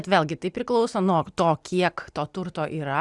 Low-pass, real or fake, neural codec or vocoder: 10.8 kHz; real; none